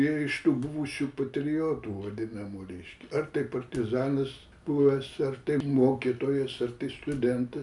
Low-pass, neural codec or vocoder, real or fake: 10.8 kHz; none; real